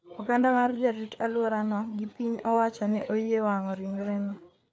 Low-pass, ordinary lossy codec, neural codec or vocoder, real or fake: none; none; codec, 16 kHz, 4 kbps, FreqCodec, larger model; fake